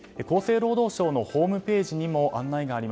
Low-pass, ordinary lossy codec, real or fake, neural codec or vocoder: none; none; real; none